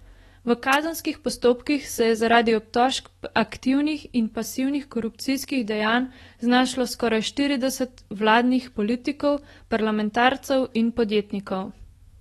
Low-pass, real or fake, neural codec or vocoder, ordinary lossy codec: 19.8 kHz; fake; autoencoder, 48 kHz, 128 numbers a frame, DAC-VAE, trained on Japanese speech; AAC, 32 kbps